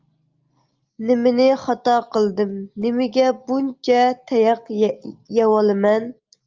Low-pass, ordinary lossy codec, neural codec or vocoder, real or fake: 7.2 kHz; Opus, 32 kbps; none; real